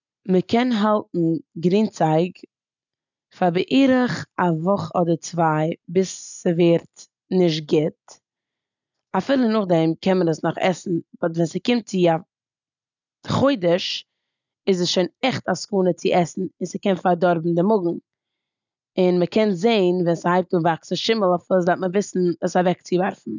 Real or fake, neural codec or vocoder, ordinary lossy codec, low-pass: real; none; none; 7.2 kHz